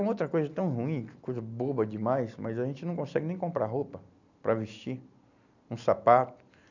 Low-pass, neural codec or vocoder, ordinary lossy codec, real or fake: 7.2 kHz; none; none; real